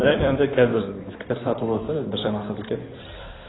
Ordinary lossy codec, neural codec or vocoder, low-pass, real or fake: AAC, 16 kbps; codec, 16 kHz in and 24 kHz out, 2.2 kbps, FireRedTTS-2 codec; 7.2 kHz; fake